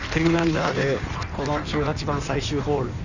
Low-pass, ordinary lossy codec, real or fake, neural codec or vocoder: 7.2 kHz; none; fake; codec, 16 kHz in and 24 kHz out, 1.1 kbps, FireRedTTS-2 codec